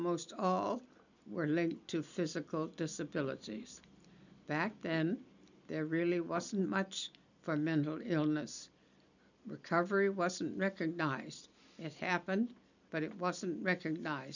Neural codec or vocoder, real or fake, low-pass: none; real; 7.2 kHz